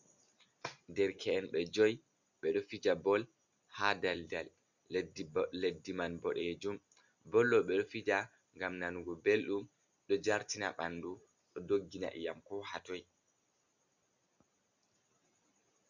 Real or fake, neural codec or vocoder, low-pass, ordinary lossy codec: real; none; 7.2 kHz; Opus, 64 kbps